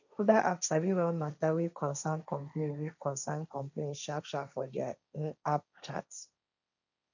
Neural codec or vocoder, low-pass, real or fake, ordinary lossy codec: codec, 16 kHz, 1.1 kbps, Voila-Tokenizer; 7.2 kHz; fake; none